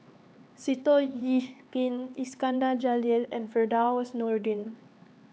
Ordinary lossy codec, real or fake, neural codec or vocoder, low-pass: none; fake; codec, 16 kHz, 4 kbps, X-Codec, HuBERT features, trained on LibriSpeech; none